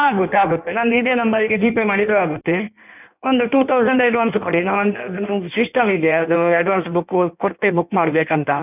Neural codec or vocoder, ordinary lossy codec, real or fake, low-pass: codec, 16 kHz in and 24 kHz out, 1.1 kbps, FireRedTTS-2 codec; none; fake; 3.6 kHz